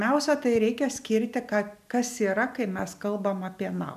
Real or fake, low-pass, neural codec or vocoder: real; 14.4 kHz; none